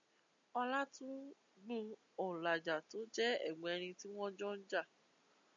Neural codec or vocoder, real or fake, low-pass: none; real; 7.2 kHz